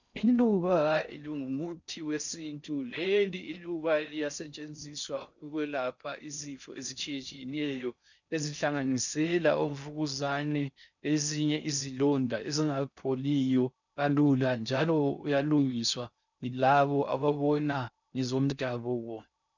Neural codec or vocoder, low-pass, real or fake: codec, 16 kHz in and 24 kHz out, 0.6 kbps, FocalCodec, streaming, 4096 codes; 7.2 kHz; fake